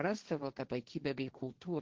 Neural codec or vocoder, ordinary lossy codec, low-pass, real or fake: codec, 16 kHz, 1.1 kbps, Voila-Tokenizer; Opus, 16 kbps; 7.2 kHz; fake